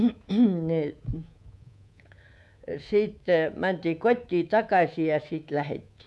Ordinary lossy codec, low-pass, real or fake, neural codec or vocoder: none; none; fake; codec, 24 kHz, 3.1 kbps, DualCodec